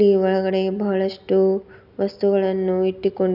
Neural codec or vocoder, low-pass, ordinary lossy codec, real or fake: none; 5.4 kHz; none; real